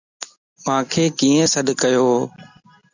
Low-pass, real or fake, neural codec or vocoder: 7.2 kHz; real; none